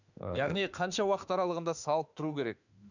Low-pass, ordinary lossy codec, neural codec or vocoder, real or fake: 7.2 kHz; none; autoencoder, 48 kHz, 32 numbers a frame, DAC-VAE, trained on Japanese speech; fake